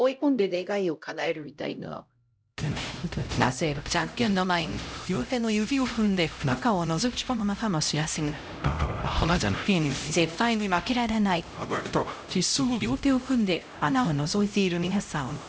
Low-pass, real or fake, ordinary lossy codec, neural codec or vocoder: none; fake; none; codec, 16 kHz, 0.5 kbps, X-Codec, HuBERT features, trained on LibriSpeech